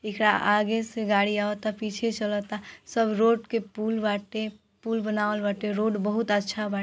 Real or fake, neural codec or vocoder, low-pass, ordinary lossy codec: real; none; none; none